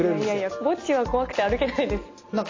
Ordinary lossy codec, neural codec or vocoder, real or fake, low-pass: AAC, 32 kbps; none; real; 7.2 kHz